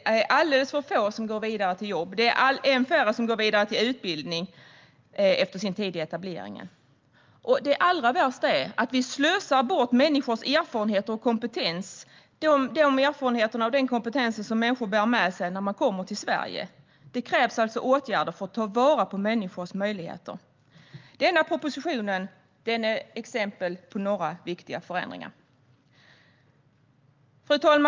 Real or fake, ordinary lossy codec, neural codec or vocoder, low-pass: real; Opus, 24 kbps; none; 7.2 kHz